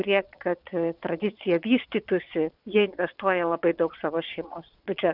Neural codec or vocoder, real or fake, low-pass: vocoder, 22.05 kHz, 80 mel bands, Vocos; fake; 5.4 kHz